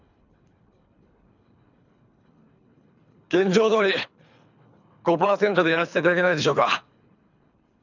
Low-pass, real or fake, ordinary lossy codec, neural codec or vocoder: 7.2 kHz; fake; none; codec, 24 kHz, 3 kbps, HILCodec